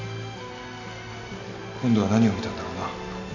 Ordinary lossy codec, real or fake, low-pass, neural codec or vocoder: none; real; 7.2 kHz; none